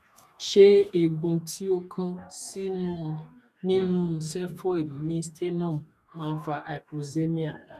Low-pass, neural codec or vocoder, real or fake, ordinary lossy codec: 14.4 kHz; codec, 44.1 kHz, 2.6 kbps, DAC; fake; none